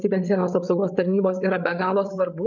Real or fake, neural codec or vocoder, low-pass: fake; codec, 16 kHz, 8 kbps, FreqCodec, larger model; 7.2 kHz